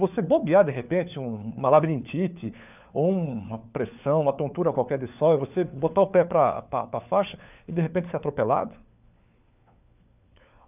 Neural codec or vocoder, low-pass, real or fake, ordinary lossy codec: codec, 16 kHz, 4 kbps, FunCodec, trained on LibriTTS, 50 frames a second; 3.6 kHz; fake; none